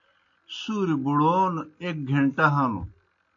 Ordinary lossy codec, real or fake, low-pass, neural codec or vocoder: MP3, 48 kbps; real; 7.2 kHz; none